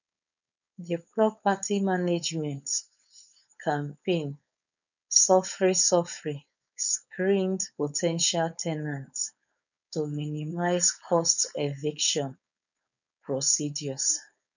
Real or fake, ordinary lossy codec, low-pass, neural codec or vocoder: fake; none; 7.2 kHz; codec, 16 kHz, 4.8 kbps, FACodec